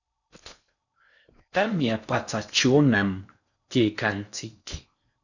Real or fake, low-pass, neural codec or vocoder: fake; 7.2 kHz; codec, 16 kHz in and 24 kHz out, 0.6 kbps, FocalCodec, streaming, 4096 codes